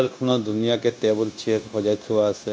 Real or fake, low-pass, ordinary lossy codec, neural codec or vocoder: fake; none; none; codec, 16 kHz, 0.9 kbps, LongCat-Audio-Codec